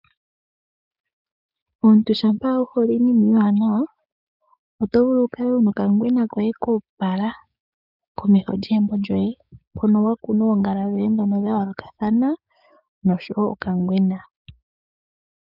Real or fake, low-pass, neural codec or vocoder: fake; 5.4 kHz; codec, 16 kHz, 6 kbps, DAC